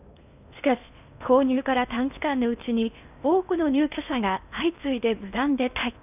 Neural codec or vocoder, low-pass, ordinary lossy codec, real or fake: codec, 16 kHz in and 24 kHz out, 0.8 kbps, FocalCodec, streaming, 65536 codes; 3.6 kHz; none; fake